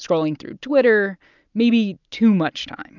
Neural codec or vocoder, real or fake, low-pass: none; real; 7.2 kHz